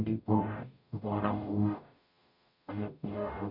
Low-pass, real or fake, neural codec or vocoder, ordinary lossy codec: 5.4 kHz; fake; codec, 44.1 kHz, 0.9 kbps, DAC; none